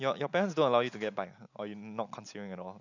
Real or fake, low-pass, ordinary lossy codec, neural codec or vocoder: real; 7.2 kHz; none; none